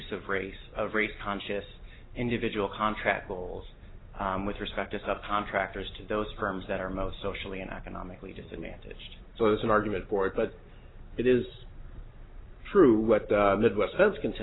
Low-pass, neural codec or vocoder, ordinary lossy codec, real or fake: 7.2 kHz; none; AAC, 16 kbps; real